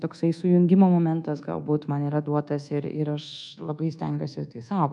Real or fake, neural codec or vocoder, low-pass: fake; codec, 24 kHz, 1.2 kbps, DualCodec; 10.8 kHz